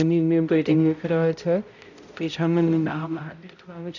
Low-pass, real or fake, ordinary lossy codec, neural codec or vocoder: 7.2 kHz; fake; none; codec, 16 kHz, 0.5 kbps, X-Codec, HuBERT features, trained on balanced general audio